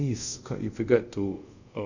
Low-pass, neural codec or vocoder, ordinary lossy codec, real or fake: 7.2 kHz; codec, 24 kHz, 0.5 kbps, DualCodec; none; fake